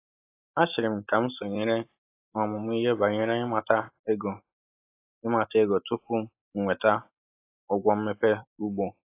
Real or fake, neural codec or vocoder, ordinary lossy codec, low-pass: real; none; AAC, 24 kbps; 3.6 kHz